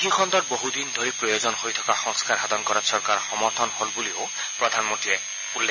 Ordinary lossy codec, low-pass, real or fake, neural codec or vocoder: none; 7.2 kHz; real; none